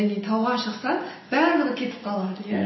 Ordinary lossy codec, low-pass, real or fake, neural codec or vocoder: MP3, 24 kbps; 7.2 kHz; real; none